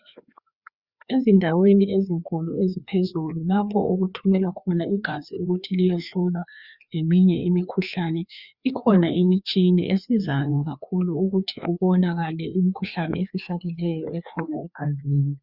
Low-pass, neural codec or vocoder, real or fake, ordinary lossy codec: 5.4 kHz; codec, 16 kHz, 2 kbps, FreqCodec, larger model; fake; Opus, 64 kbps